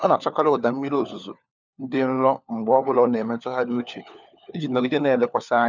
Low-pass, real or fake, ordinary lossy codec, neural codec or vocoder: 7.2 kHz; fake; none; codec, 16 kHz, 4 kbps, FunCodec, trained on LibriTTS, 50 frames a second